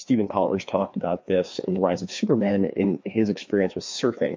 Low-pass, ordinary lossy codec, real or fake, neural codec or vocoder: 7.2 kHz; MP3, 48 kbps; fake; codec, 16 kHz, 2 kbps, FreqCodec, larger model